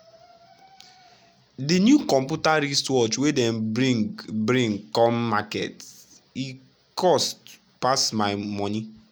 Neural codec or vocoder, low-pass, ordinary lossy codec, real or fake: none; none; none; real